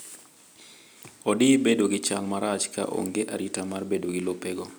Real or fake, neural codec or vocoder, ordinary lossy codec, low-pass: real; none; none; none